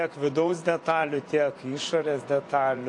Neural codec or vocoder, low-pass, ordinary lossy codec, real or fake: vocoder, 24 kHz, 100 mel bands, Vocos; 10.8 kHz; AAC, 48 kbps; fake